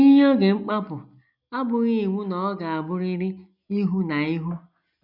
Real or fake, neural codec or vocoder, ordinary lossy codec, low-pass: real; none; none; 5.4 kHz